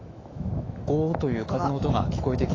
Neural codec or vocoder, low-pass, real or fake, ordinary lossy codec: autoencoder, 48 kHz, 128 numbers a frame, DAC-VAE, trained on Japanese speech; 7.2 kHz; fake; AAC, 32 kbps